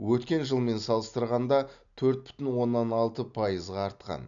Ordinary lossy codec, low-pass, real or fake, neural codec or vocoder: none; 7.2 kHz; real; none